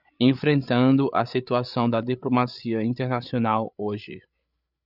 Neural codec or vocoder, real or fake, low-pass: codec, 16 kHz, 8 kbps, FreqCodec, larger model; fake; 5.4 kHz